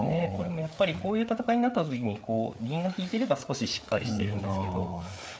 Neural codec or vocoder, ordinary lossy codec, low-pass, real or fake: codec, 16 kHz, 4 kbps, FreqCodec, larger model; none; none; fake